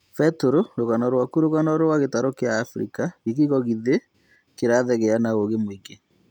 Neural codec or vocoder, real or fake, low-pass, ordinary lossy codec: none; real; 19.8 kHz; none